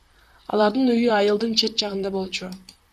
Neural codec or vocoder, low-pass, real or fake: vocoder, 44.1 kHz, 128 mel bands, Pupu-Vocoder; 14.4 kHz; fake